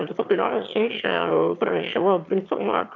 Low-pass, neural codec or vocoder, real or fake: 7.2 kHz; autoencoder, 22.05 kHz, a latent of 192 numbers a frame, VITS, trained on one speaker; fake